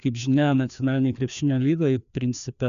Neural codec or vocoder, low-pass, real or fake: codec, 16 kHz, 2 kbps, FreqCodec, larger model; 7.2 kHz; fake